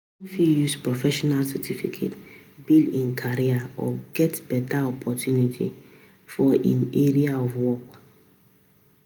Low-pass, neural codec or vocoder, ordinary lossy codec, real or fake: none; none; none; real